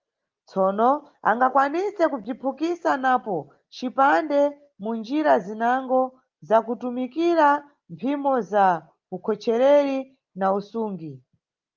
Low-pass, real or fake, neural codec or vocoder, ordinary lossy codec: 7.2 kHz; real; none; Opus, 32 kbps